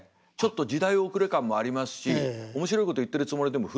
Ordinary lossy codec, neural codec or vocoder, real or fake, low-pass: none; none; real; none